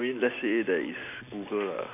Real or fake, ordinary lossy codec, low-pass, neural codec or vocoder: real; none; 3.6 kHz; none